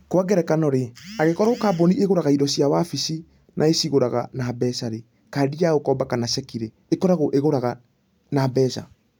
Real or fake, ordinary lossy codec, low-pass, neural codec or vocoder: real; none; none; none